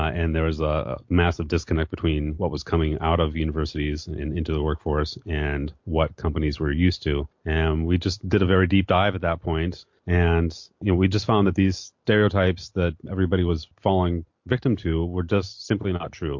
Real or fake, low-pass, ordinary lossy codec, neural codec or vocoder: real; 7.2 kHz; MP3, 48 kbps; none